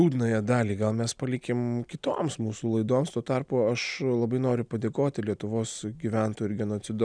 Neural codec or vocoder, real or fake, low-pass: none; real; 9.9 kHz